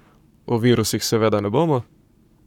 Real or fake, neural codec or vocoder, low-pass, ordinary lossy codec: fake; codec, 44.1 kHz, 7.8 kbps, Pupu-Codec; 19.8 kHz; none